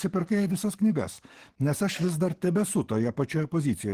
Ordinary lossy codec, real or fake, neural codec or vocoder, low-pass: Opus, 16 kbps; fake; codec, 44.1 kHz, 7.8 kbps, DAC; 14.4 kHz